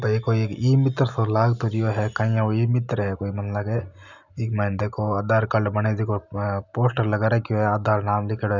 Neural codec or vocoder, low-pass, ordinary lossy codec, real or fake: none; 7.2 kHz; none; real